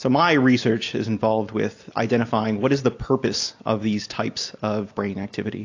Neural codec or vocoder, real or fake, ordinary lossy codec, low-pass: none; real; AAC, 48 kbps; 7.2 kHz